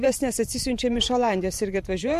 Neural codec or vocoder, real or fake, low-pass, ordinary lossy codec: vocoder, 44.1 kHz, 128 mel bands every 512 samples, BigVGAN v2; fake; 14.4 kHz; MP3, 64 kbps